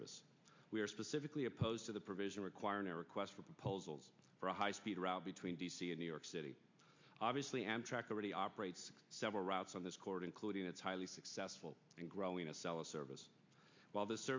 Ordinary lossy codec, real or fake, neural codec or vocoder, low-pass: MP3, 48 kbps; real; none; 7.2 kHz